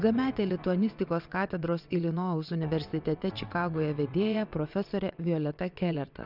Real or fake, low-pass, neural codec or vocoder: fake; 5.4 kHz; vocoder, 22.05 kHz, 80 mel bands, WaveNeXt